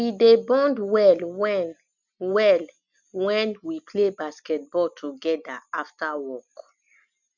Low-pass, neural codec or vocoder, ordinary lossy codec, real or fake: 7.2 kHz; none; none; real